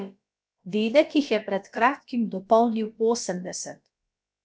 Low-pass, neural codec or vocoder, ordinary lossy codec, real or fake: none; codec, 16 kHz, about 1 kbps, DyCAST, with the encoder's durations; none; fake